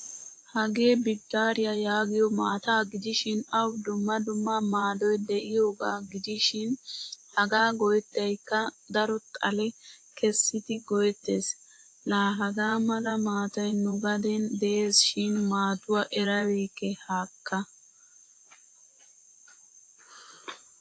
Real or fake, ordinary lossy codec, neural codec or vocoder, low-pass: fake; AAC, 48 kbps; vocoder, 24 kHz, 100 mel bands, Vocos; 9.9 kHz